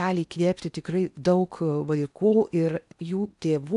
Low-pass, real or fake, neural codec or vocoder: 10.8 kHz; fake; codec, 16 kHz in and 24 kHz out, 0.8 kbps, FocalCodec, streaming, 65536 codes